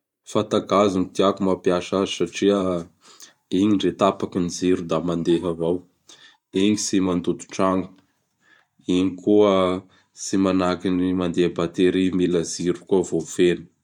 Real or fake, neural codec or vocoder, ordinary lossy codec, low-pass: fake; vocoder, 44.1 kHz, 128 mel bands every 512 samples, BigVGAN v2; MP3, 96 kbps; 19.8 kHz